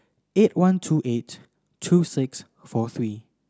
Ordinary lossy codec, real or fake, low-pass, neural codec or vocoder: none; real; none; none